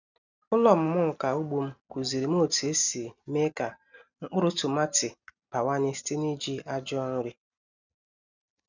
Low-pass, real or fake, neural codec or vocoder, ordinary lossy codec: 7.2 kHz; real; none; none